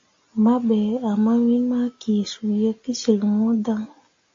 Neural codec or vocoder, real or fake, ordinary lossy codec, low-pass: none; real; AAC, 48 kbps; 7.2 kHz